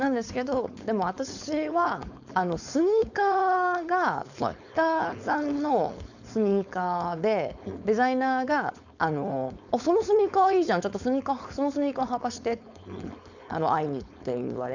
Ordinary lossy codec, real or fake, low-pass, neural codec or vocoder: none; fake; 7.2 kHz; codec, 16 kHz, 4.8 kbps, FACodec